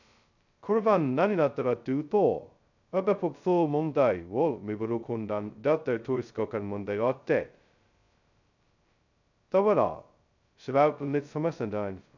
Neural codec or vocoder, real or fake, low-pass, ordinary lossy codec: codec, 16 kHz, 0.2 kbps, FocalCodec; fake; 7.2 kHz; none